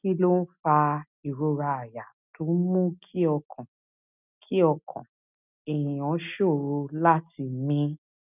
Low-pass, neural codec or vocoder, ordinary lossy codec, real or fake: 3.6 kHz; none; none; real